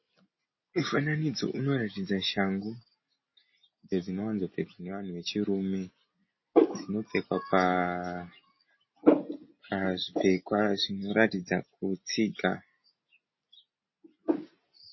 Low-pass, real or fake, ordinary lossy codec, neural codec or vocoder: 7.2 kHz; real; MP3, 24 kbps; none